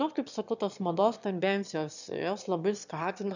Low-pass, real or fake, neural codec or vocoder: 7.2 kHz; fake; autoencoder, 22.05 kHz, a latent of 192 numbers a frame, VITS, trained on one speaker